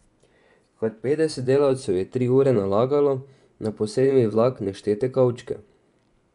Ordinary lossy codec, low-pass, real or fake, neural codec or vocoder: none; 10.8 kHz; fake; vocoder, 24 kHz, 100 mel bands, Vocos